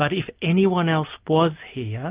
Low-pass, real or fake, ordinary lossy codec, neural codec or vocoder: 3.6 kHz; real; Opus, 24 kbps; none